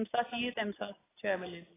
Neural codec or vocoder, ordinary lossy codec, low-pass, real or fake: none; AAC, 16 kbps; 3.6 kHz; real